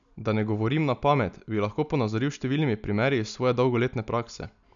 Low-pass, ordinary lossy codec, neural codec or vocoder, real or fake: 7.2 kHz; none; none; real